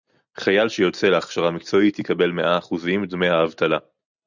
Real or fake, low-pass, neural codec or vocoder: real; 7.2 kHz; none